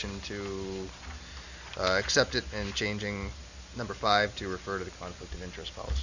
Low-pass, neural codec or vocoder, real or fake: 7.2 kHz; none; real